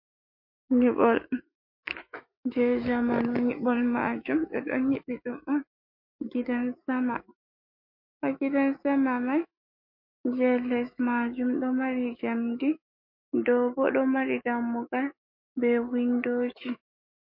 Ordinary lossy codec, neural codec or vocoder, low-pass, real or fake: MP3, 32 kbps; autoencoder, 48 kHz, 128 numbers a frame, DAC-VAE, trained on Japanese speech; 5.4 kHz; fake